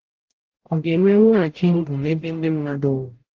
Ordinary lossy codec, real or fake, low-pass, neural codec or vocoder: Opus, 16 kbps; fake; 7.2 kHz; codec, 44.1 kHz, 0.9 kbps, DAC